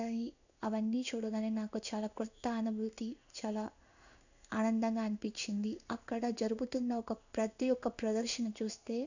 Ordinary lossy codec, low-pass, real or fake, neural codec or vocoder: none; 7.2 kHz; fake; codec, 16 kHz in and 24 kHz out, 1 kbps, XY-Tokenizer